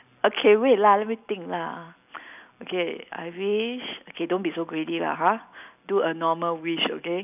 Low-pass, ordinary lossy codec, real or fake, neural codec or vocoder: 3.6 kHz; none; real; none